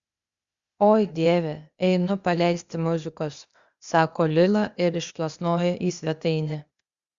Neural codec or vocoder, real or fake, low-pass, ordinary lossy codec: codec, 16 kHz, 0.8 kbps, ZipCodec; fake; 7.2 kHz; Opus, 64 kbps